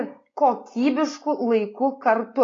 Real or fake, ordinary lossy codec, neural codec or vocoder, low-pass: real; AAC, 32 kbps; none; 7.2 kHz